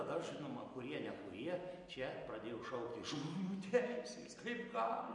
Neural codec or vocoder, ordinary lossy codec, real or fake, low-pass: none; MP3, 48 kbps; real; 10.8 kHz